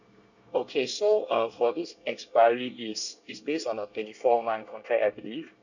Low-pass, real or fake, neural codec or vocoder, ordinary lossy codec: 7.2 kHz; fake; codec, 24 kHz, 1 kbps, SNAC; none